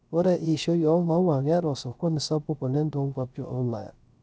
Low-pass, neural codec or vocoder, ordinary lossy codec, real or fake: none; codec, 16 kHz, 0.3 kbps, FocalCodec; none; fake